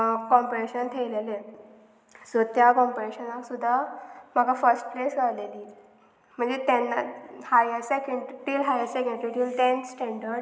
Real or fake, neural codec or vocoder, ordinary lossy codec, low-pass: real; none; none; none